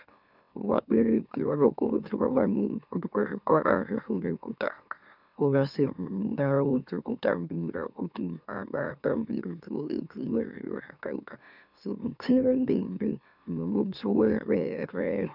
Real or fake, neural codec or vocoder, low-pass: fake; autoencoder, 44.1 kHz, a latent of 192 numbers a frame, MeloTTS; 5.4 kHz